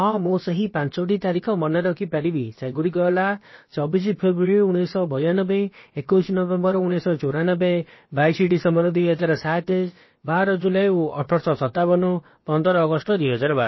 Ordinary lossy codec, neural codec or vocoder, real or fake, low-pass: MP3, 24 kbps; codec, 16 kHz, about 1 kbps, DyCAST, with the encoder's durations; fake; 7.2 kHz